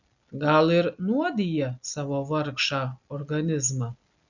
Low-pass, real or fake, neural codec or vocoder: 7.2 kHz; real; none